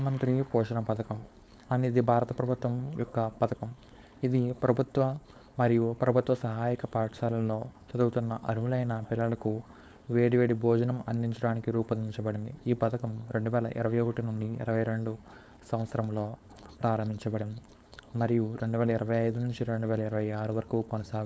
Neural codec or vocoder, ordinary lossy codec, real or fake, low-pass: codec, 16 kHz, 4.8 kbps, FACodec; none; fake; none